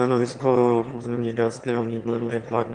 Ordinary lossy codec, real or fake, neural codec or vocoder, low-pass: Opus, 24 kbps; fake; autoencoder, 22.05 kHz, a latent of 192 numbers a frame, VITS, trained on one speaker; 9.9 kHz